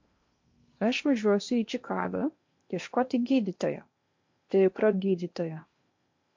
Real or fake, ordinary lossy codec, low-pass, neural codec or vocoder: fake; MP3, 48 kbps; 7.2 kHz; codec, 16 kHz in and 24 kHz out, 0.8 kbps, FocalCodec, streaming, 65536 codes